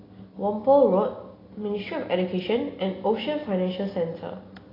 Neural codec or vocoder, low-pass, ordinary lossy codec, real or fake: none; 5.4 kHz; AAC, 24 kbps; real